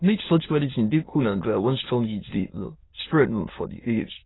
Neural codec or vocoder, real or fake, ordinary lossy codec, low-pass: autoencoder, 22.05 kHz, a latent of 192 numbers a frame, VITS, trained on many speakers; fake; AAC, 16 kbps; 7.2 kHz